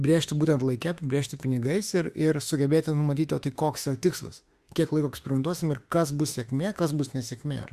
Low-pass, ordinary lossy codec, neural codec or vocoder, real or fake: 14.4 kHz; Opus, 64 kbps; autoencoder, 48 kHz, 32 numbers a frame, DAC-VAE, trained on Japanese speech; fake